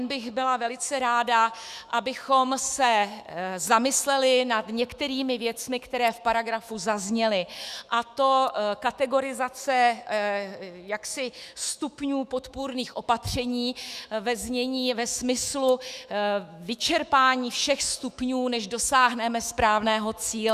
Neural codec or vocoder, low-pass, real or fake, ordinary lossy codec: autoencoder, 48 kHz, 128 numbers a frame, DAC-VAE, trained on Japanese speech; 14.4 kHz; fake; Opus, 64 kbps